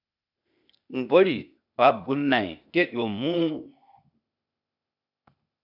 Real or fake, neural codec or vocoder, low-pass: fake; codec, 16 kHz, 0.8 kbps, ZipCodec; 5.4 kHz